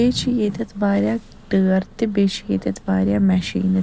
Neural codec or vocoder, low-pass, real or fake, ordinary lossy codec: none; none; real; none